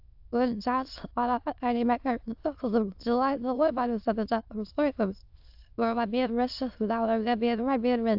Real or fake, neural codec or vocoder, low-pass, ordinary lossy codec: fake; autoencoder, 22.05 kHz, a latent of 192 numbers a frame, VITS, trained on many speakers; 5.4 kHz; AAC, 48 kbps